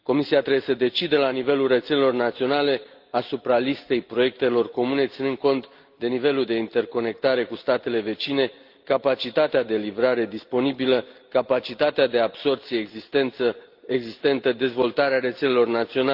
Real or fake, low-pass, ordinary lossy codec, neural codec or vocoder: real; 5.4 kHz; Opus, 32 kbps; none